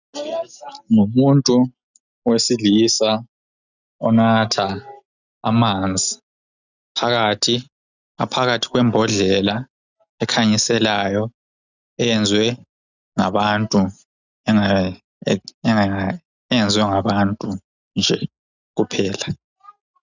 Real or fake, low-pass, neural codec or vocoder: real; 7.2 kHz; none